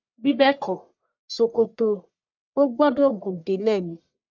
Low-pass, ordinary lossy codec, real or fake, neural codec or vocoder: 7.2 kHz; none; fake; codec, 44.1 kHz, 1.7 kbps, Pupu-Codec